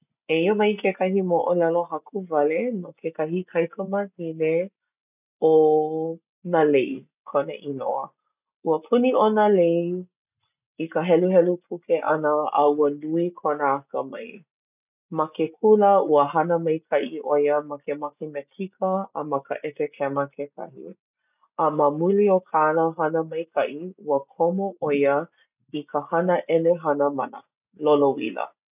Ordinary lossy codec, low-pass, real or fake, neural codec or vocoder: none; 3.6 kHz; real; none